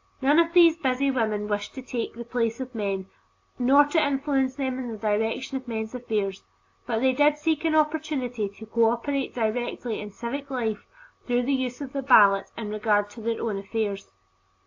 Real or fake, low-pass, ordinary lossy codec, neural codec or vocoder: real; 7.2 kHz; AAC, 48 kbps; none